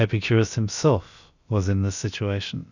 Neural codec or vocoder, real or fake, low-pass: codec, 16 kHz, about 1 kbps, DyCAST, with the encoder's durations; fake; 7.2 kHz